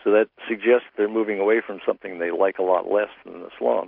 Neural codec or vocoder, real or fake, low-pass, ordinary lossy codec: none; real; 5.4 kHz; MP3, 48 kbps